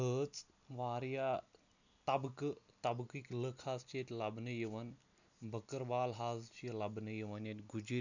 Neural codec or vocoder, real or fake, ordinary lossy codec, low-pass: none; real; none; 7.2 kHz